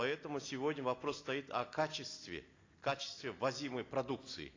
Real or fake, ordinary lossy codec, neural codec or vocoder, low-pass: real; AAC, 32 kbps; none; 7.2 kHz